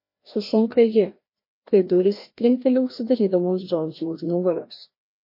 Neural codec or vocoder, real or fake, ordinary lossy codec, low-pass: codec, 16 kHz, 1 kbps, FreqCodec, larger model; fake; MP3, 32 kbps; 5.4 kHz